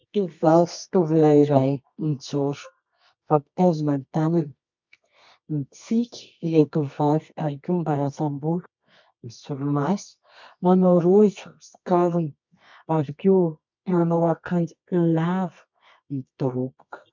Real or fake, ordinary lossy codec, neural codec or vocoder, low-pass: fake; MP3, 64 kbps; codec, 24 kHz, 0.9 kbps, WavTokenizer, medium music audio release; 7.2 kHz